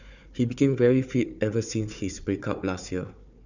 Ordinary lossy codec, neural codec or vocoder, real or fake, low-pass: none; codec, 16 kHz, 4 kbps, FunCodec, trained on Chinese and English, 50 frames a second; fake; 7.2 kHz